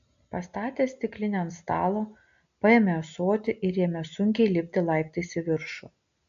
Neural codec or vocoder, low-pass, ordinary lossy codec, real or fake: none; 7.2 kHz; AAC, 64 kbps; real